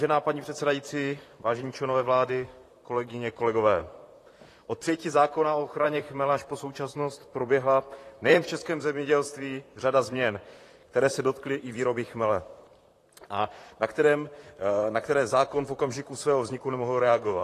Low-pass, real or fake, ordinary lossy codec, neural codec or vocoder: 14.4 kHz; fake; AAC, 48 kbps; vocoder, 44.1 kHz, 128 mel bands, Pupu-Vocoder